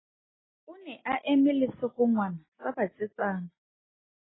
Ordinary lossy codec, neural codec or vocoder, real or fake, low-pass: AAC, 16 kbps; none; real; 7.2 kHz